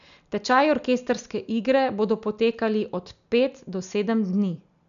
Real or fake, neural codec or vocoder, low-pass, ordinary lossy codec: real; none; 7.2 kHz; none